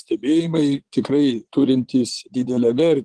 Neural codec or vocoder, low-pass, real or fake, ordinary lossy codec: vocoder, 22.05 kHz, 80 mel bands, Vocos; 9.9 kHz; fake; Opus, 16 kbps